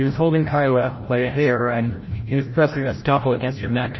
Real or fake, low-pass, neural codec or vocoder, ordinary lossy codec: fake; 7.2 kHz; codec, 16 kHz, 0.5 kbps, FreqCodec, larger model; MP3, 24 kbps